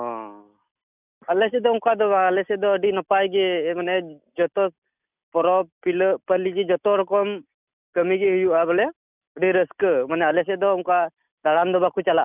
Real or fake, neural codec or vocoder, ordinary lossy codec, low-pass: real; none; none; 3.6 kHz